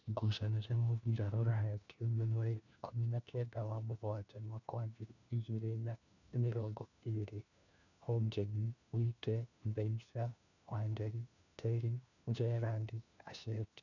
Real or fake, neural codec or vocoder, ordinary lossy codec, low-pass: fake; codec, 16 kHz, 1 kbps, FunCodec, trained on LibriTTS, 50 frames a second; none; 7.2 kHz